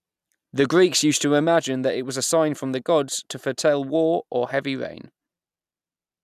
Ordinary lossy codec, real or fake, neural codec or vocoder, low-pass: none; real; none; 14.4 kHz